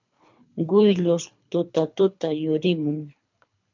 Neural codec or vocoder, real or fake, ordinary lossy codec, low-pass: codec, 24 kHz, 3 kbps, HILCodec; fake; MP3, 64 kbps; 7.2 kHz